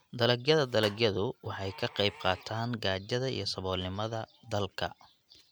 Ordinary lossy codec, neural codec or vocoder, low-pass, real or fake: none; none; none; real